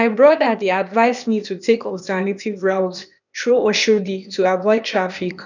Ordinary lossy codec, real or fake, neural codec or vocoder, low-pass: none; fake; codec, 16 kHz, 0.8 kbps, ZipCodec; 7.2 kHz